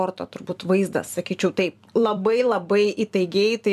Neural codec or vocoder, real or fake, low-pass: vocoder, 44.1 kHz, 128 mel bands every 256 samples, BigVGAN v2; fake; 14.4 kHz